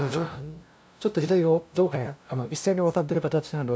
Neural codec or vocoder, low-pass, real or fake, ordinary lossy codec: codec, 16 kHz, 0.5 kbps, FunCodec, trained on LibriTTS, 25 frames a second; none; fake; none